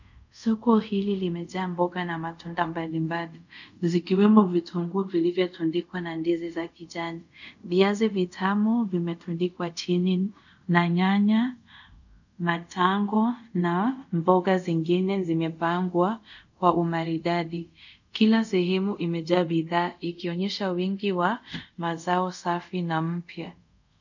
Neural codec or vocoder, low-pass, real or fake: codec, 24 kHz, 0.5 kbps, DualCodec; 7.2 kHz; fake